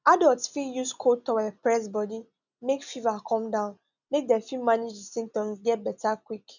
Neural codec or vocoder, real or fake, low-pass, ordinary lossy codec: none; real; 7.2 kHz; none